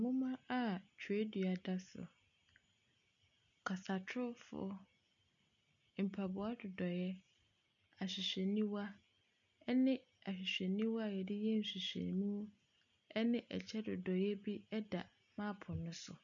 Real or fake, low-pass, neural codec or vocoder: real; 7.2 kHz; none